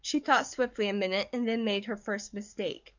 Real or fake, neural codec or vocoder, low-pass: fake; codec, 44.1 kHz, 7.8 kbps, DAC; 7.2 kHz